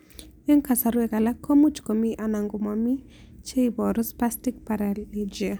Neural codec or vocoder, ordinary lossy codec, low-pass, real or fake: none; none; none; real